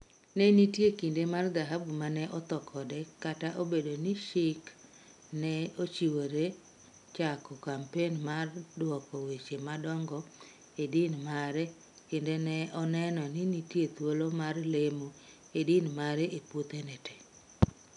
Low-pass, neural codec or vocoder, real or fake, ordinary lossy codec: 10.8 kHz; none; real; none